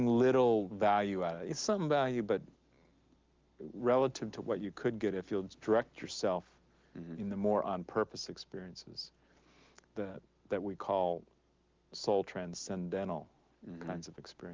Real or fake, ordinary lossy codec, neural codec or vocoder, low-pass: real; Opus, 32 kbps; none; 7.2 kHz